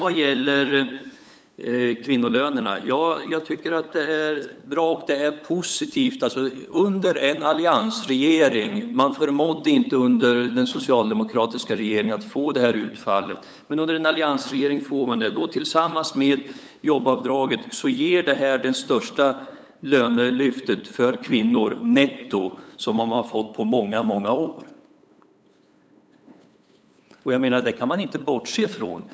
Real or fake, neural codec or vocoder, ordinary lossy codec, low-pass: fake; codec, 16 kHz, 8 kbps, FunCodec, trained on LibriTTS, 25 frames a second; none; none